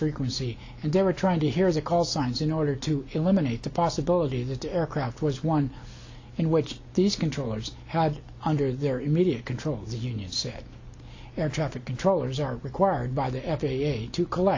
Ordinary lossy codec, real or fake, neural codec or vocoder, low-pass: AAC, 48 kbps; real; none; 7.2 kHz